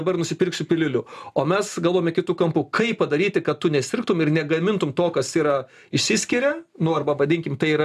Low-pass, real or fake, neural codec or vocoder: 14.4 kHz; real; none